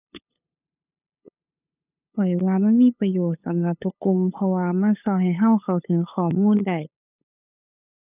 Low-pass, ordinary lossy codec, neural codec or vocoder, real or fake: 3.6 kHz; none; codec, 16 kHz, 8 kbps, FunCodec, trained on LibriTTS, 25 frames a second; fake